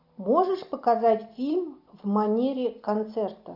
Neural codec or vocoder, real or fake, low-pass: none; real; 5.4 kHz